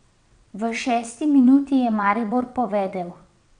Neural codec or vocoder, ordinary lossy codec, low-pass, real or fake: vocoder, 22.05 kHz, 80 mel bands, WaveNeXt; none; 9.9 kHz; fake